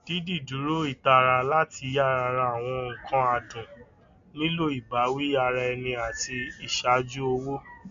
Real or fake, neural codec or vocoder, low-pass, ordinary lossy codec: real; none; 7.2 kHz; MP3, 64 kbps